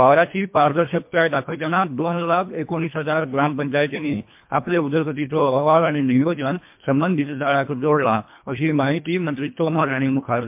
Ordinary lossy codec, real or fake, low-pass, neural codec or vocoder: MP3, 32 kbps; fake; 3.6 kHz; codec, 24 kHz, 1.5 kbps, HILCodec